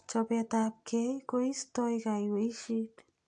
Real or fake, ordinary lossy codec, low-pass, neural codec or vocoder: real; AAC, 64 kbps; 9.9 kHz; none